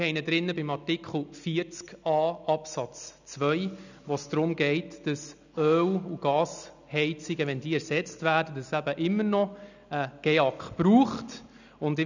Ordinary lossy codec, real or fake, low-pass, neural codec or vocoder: none; real; 7.2 kHz; none